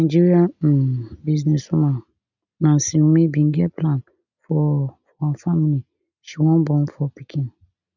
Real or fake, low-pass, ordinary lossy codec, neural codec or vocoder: real; 7.2 kHz; none; none